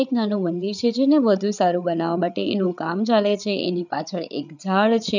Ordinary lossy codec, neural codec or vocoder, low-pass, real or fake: none; codec, 16 kHz, 8 kbps, FreqCodec, larger model; 7.2 kHz; fake